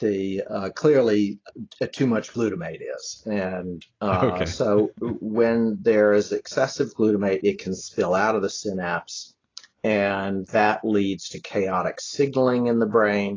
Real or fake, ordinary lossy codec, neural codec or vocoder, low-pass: real; AAC, 32 kbps; none; 7.2 kHz